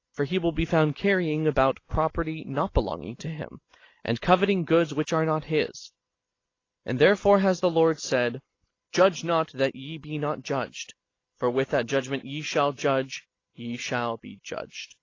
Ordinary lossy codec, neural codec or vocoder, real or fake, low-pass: AAC, 32 kbps; none; real; 7.2 kHz